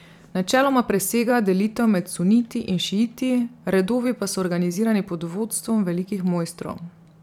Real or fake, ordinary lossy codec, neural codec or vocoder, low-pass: fake; none; vocoder, 44.1 kHz, 128 mel bands every 512 samples, BigVGAN v2; 19.8 kHz